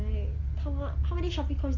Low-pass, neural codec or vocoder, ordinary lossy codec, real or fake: 7.2 kHz; none; Opus, 32 kbps; real